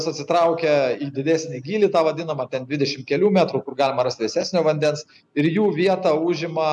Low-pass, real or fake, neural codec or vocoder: 10.8 kHz; real; none